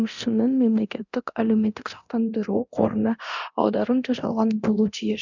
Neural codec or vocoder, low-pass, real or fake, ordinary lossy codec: codec, 24 kHz, 0.9 kbps, DualCodec; 7.2 kHz; fake; none